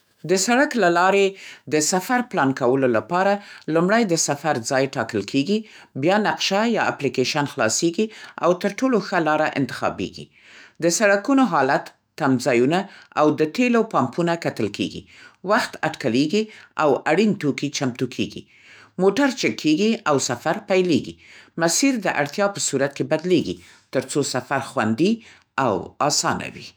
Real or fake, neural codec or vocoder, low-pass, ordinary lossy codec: fake; autoencoder, 48 kHz, 128 numbers a frame, DAC-VAE, trained on Japanese speech; none; none